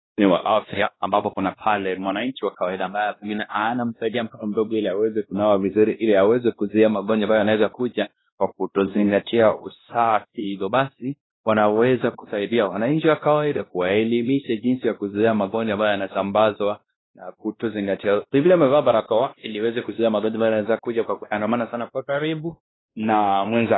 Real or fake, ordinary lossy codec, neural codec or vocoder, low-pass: fake; AAC, 16 kbps; codec, 16 kHz, 1 kbps, X-Codec, WavLM features, trained on Multilingual LibriSpeech; 7.2 kHz